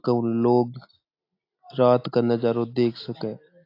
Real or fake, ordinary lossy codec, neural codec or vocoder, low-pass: real; AAC, 32 kbps; none; 5.4 kHz